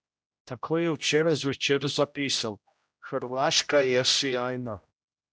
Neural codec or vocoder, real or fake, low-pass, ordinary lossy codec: codec, 16 kHz, 0.5 kbps, X-Codec, HuBERT features, trained on general audio; fake; none; none